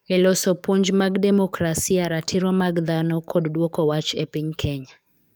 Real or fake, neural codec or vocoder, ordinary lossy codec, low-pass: fake; codec, 44.1 kHz, 7.8 kbps, DAC; none; none